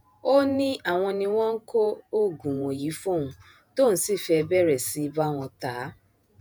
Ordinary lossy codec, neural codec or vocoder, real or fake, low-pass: none; vocoder, 48 kHz, 128 mel bands, Vocos; fake; none